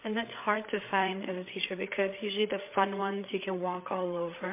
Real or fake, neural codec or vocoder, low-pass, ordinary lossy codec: fake; codec, 16 kHz, 8 kbps, FreqCodec, larger model; 3.6 kHz; MP3, 24 kbps